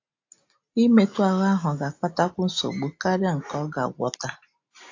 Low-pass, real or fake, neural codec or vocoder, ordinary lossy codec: 7.2 kHz; real; none; AAC, 48 kbps